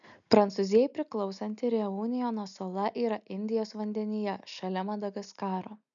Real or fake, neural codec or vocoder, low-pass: real; none; 7.2 kHz